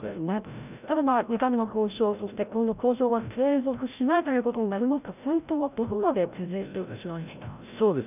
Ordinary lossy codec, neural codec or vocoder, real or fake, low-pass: none; codec, 16 kHz, 0.5 kbps, FreqCodec, larger model; fake; 3.6 kHz